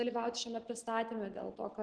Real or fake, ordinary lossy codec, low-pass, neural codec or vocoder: real; Opus, 16 kbps; 9.9 kHz; none